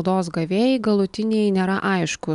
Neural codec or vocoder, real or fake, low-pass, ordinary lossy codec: none; real; 10.8 kHz; MP3, 96 kbps